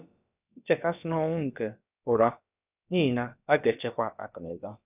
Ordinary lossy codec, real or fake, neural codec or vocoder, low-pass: none; fake; codec, 16 kHz, about 1 kbps, DyCAST, with the encoder's durations; 3.6 kHz